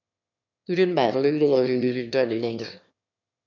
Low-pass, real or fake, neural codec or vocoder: 7.2 kHz; fake; autoencoder, 22.05 kHz, a latent of 192 numbers a frame, VITS, trained on one speaker